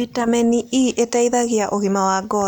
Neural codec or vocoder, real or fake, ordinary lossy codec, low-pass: none; real; none; none